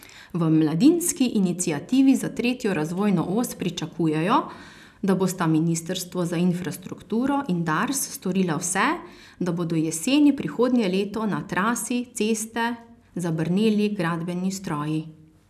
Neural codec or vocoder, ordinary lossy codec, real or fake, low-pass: none; none; real; 14.4 kHz